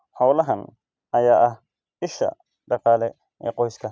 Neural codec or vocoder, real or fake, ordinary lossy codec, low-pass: none; real; none; none